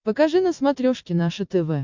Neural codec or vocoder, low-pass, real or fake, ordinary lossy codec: none; 7.2 kHz; real; MP3, 64 kbps